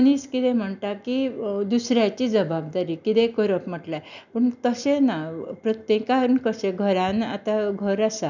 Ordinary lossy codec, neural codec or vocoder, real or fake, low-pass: none; none; real; 7.2 kHz